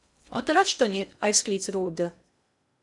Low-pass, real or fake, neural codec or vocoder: 10.8 kHz; fake; codec, 16 kHz in and 24 kHz out, 0.6 kbps, FocalCodec, streaming, 2048 codes